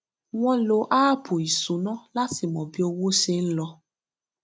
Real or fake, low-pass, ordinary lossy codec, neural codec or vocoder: real; none; none; none